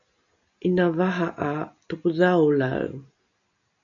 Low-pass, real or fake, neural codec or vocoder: 7.2 kHz; real; none